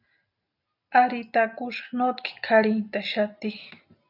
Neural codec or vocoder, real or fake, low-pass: none; real; 5.4 kHz